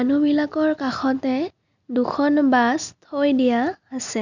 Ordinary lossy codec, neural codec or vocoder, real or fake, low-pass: none; none; real; 7.2 kHz